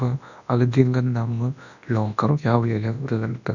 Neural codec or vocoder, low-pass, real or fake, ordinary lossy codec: codec, 24 kHz, 0.9 kbps, WavTokenizer, large speech release; 7.2 kHz; fake; none